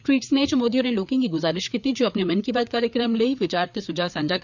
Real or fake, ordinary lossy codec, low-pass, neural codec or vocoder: fake; none; 7.2 kHz; codec, 16 kHz, 4 kbps, FreqCodec, larger model